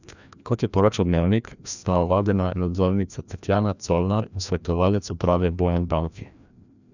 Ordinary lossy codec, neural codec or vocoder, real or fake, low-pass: none; codec, 16 kHz, 1 kbps, FreqCodec, larger model; fake; 7.2 kHz